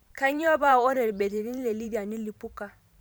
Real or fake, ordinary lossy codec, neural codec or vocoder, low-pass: fake; none; vocoder, 44.1 kHz, 128 mel bands every 512 samples, BigVGAN v2; none